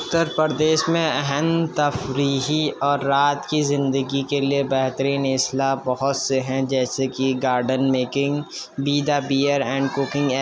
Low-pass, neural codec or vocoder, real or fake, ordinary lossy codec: none; none; real; none